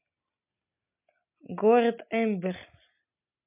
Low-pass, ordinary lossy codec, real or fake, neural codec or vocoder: 3.6 kHz; MP3, 32 kbps; real; none